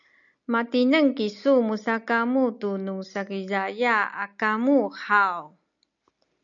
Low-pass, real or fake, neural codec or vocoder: 7.2 kHz; real; none